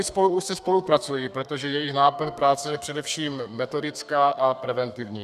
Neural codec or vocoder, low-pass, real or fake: codec, 32 kHz, 1.9 kbps, SNAC; 14.4 kHz; fake